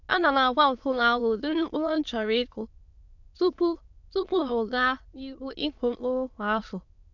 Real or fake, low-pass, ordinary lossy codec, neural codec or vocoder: fake; 7.2 kHz; none; autoencoder, 22.05 kHz, a latent of 192 numbers a frame, VITS, trained on many speakers